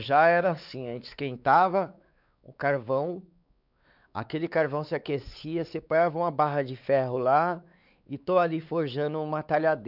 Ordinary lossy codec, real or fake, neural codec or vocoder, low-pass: none; fake; codec, 16 kHz, 4 kbps, X-Codec, WavLM features, trained on Multilingual LibriSpeech; 5.4 kHz